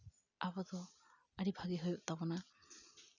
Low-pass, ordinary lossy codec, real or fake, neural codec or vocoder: 7.2 kHz; none; real; none